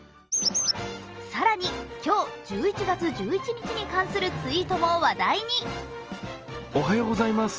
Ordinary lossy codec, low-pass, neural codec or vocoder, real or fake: Opus, 24 kbps; 7.2 kHz; none; real